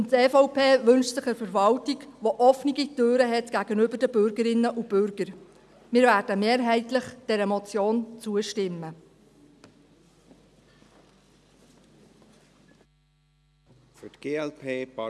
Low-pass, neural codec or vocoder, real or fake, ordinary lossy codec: none; none; real; none